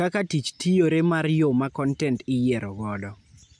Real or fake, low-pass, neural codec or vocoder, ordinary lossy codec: real; 9.9 kHz; none; none